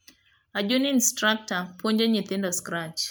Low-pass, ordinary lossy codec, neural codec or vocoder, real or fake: none; none; none; real